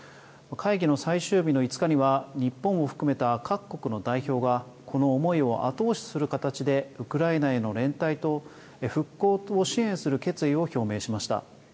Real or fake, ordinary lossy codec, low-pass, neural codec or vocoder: real; none; none; none